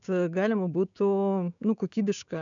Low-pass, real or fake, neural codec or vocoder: 7.2 kHz; fake; codec, 16 kHz, 6 kbps, DAC